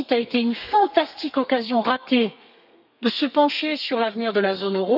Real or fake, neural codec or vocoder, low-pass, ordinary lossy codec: fake; codec, 32 kHz, 1.9 kbps, SNAC; 5.4 kHz; none